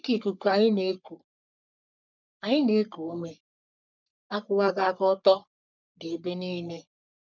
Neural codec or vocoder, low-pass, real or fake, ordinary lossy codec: codec, 44.1 kHz, 3.4 kbps, Pupu-Codec; 7.2 kHz; fake; none